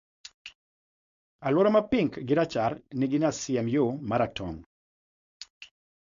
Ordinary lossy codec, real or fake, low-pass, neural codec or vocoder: MP3, 48 kbps; fake; 7.2 kHz; codec, 16 kHz, 4.8 kbps, FACodec